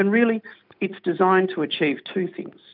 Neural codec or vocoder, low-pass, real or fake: none; 5.4 kHz; real